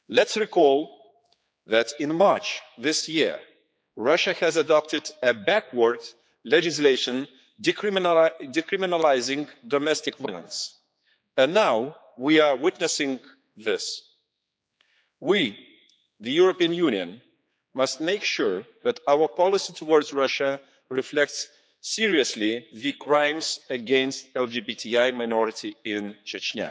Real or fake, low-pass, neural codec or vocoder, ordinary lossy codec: fake; none; codec, 16 kHz, 4 kbps, X-Codec, HuBERT features, trained on general audio; none